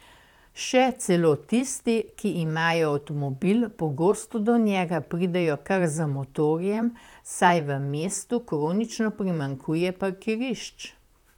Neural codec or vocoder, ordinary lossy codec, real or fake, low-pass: vocoder, 44.1 kHz, 128 mel bands, Pupu-Vocoder; none; fake; 19.8 kHz